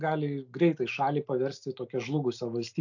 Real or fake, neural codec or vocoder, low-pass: real; none; 7.2 kHz